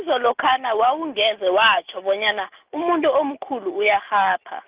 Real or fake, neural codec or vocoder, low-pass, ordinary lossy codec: real; none; 3.6 kHz; Opus, 32 kbps